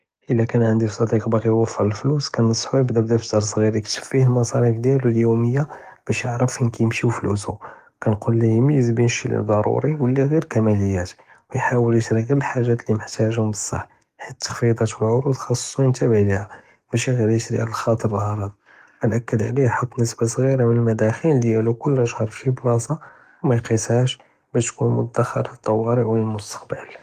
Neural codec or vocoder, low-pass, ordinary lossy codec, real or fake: codec, 44.1 kHz, 7.8 kbps, DAC; 14.4 kHz; Opus, 24 kbps; fake